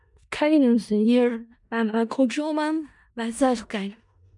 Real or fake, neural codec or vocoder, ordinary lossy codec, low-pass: fake; codec, 16 kHz in and 24 kHz out, 0.4 kbps, LongCat-Audio-Codec, four codebook decoder; MP3, 96 kbps; 10.8 kHz